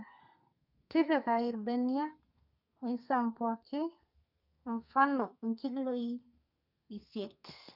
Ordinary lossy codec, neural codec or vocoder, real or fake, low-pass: none; codec, 32 kHz, 1.9 kbps, SNAC; fake; 5.4 kHz